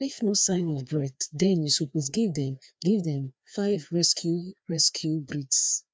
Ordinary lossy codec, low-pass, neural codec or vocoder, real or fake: none; none; codec, 16 kHz, 2 kbps, FreqCodec, larger model; fake